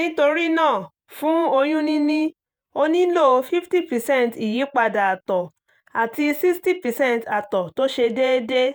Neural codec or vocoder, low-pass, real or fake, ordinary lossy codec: vocoder, 48 kHz, 128 mel bands, Vocos; none; fake; none